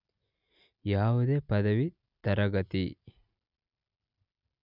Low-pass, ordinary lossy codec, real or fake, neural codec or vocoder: 5.4 kHz; none; real; none